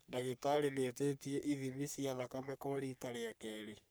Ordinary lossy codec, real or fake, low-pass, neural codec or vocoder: none; fake; none; codec, 44.1 kHz, 3.4 kbps, Pupu-Codec